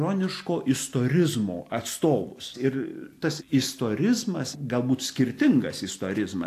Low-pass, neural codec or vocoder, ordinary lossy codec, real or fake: 14.4 kHz; none; AAC, 64 kbps; real